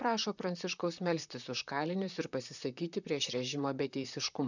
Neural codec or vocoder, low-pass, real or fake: vocoder, 44.1 kHz, 128 mel bands, Pupu-Vocoder; 7.2 kHz; fake